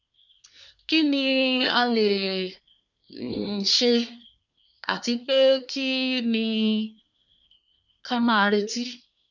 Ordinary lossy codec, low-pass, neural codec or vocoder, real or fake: none; 7.2 kHz; codec, 24 kHz, 1 kbps, SNAC; fake